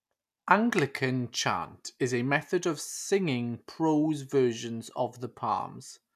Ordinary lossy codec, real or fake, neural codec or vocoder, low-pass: none; real; none; 14.4 kHz